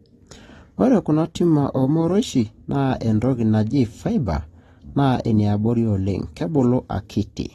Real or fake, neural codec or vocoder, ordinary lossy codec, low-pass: real; none; AAC, 32 kbps; 19.8 kHz